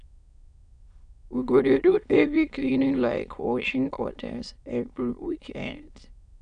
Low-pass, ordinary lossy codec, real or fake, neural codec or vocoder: 9.9 kHz; none; fake; autoencoder, 22.05 kHz, a latent of 192 numbers a frame, VITS, trained on many speakers